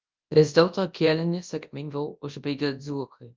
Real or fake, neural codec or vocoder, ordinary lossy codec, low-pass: fake; codec, 24 kHz, 0.9 kbps, WavTokenizer, large speech release; Opus, 24 kbps; 7.2 kHz